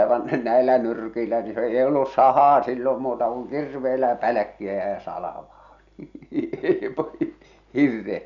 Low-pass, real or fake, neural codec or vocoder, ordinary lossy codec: 7.2 kHz; real; none; none